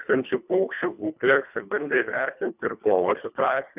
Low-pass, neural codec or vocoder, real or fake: 3.6 kHz; codec, 24 kHz, 1.5 kbps, HILCodec; fake